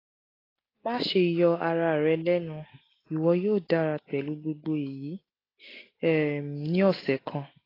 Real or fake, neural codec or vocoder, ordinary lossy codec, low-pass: real; none; AAC, 24 kbps; 5.4 kHz